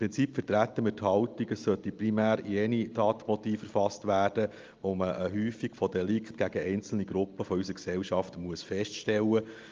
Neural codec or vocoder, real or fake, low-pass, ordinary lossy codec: none; real; 7.2 kHz; Opus, 24 kbps